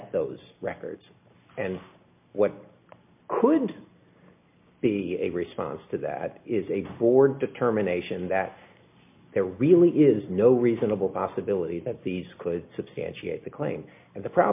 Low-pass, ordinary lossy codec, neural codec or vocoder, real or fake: 3.6 kHz; MP3, 32 kbps; none; real